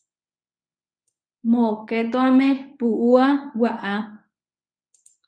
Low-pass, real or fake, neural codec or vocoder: 9.9 kHz; fake; codec, 24 kHz, 0.9 kbps, WavTokenizer, medium speech release version 1